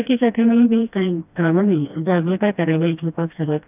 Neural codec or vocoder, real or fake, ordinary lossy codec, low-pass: codec, 16 kHz, 1 kbps, FreqCodec, smaller model; fake; none; 3.6 kHz